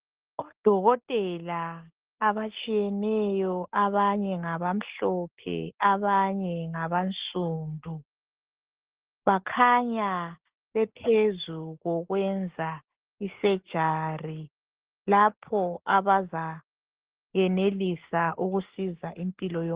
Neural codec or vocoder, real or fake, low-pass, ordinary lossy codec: none; real; 3.6 kHz; Opus, 16 kbps